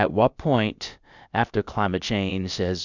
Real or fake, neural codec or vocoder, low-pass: fake; codec, 16 kHz, about 1 kbps, DyCAST, with the encoder's durations; 7.2 kHz